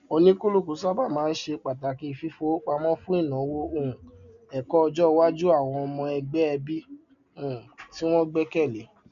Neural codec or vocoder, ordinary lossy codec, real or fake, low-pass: none; MP3, 96 kbps; real; 7.2 kHz